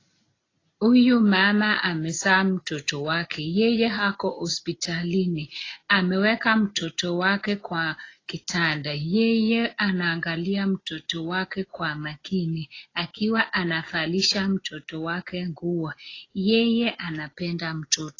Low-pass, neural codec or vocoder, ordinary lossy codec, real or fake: 7.2 kHz; none; AAC, 32 kbps; real